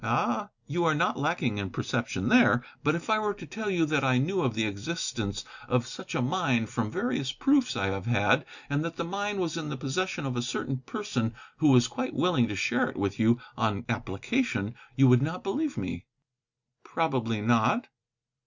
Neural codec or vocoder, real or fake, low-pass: none; real; 7.2 kHz